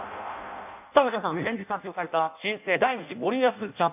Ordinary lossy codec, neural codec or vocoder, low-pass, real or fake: none; codec, 16 kHz in and 24 kHz out, 0.6 kbps, FireRedTTS-2 codec; 3.6 kHz; fake